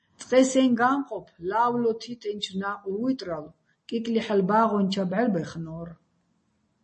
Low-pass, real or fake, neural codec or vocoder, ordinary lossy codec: 9.9 kHz; real; none; MP3, 32 kbps